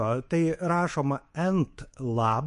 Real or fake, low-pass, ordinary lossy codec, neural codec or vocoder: fake; 10.8 kHz; MP3, 48 kbps; codec, 24 kHz, 3.1 kbps, DualCodec